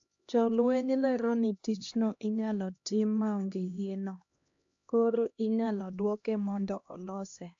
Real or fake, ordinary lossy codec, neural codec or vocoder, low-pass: fake; AAC, 64 kbps; codec, 16 kHz, 1 kbps, X-Codec, HuBERT features, trained on LibriSpeech; 7.2 kHz